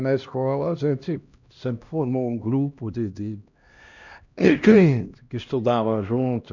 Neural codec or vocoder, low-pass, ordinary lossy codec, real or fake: codec, 16 kHz, 1 kbps, X-Codec, HuBERT features, trained on LibriSpeech; 7.2 kHz; none; fake